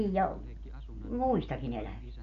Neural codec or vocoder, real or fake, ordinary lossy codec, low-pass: none; real; none; 7.2 kHz